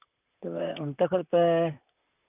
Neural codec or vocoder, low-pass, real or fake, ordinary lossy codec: vocoder, 44.1 kHz, 128 mel bands, Pupu-Vocoder; 3.6 kHz; fake; none